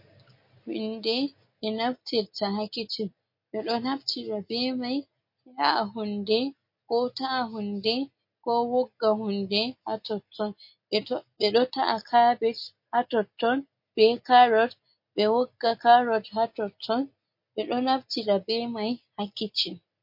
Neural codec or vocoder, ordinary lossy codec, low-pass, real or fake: none; MP3, 32 kbps; 5.4 kHz; real